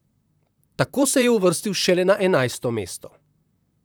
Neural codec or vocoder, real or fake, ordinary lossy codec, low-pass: vocoder, 44.1 kHz, 128 mel bands, Pupu-Vocoder; fake; none; none